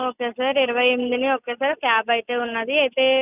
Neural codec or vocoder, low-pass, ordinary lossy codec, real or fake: none; 3.6 kHz; none; real